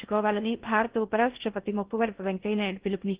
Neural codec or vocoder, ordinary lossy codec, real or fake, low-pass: codec, 16 kHz in and 24 kHz out, 0.8 kbps, FocalCodec, streaming, 65536 codes; Opus, 16 kbps; fake; 3.6 kHz